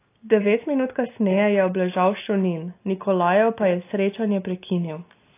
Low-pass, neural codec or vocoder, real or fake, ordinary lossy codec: 3.6 kHz; none; real; AAC, 24 kbps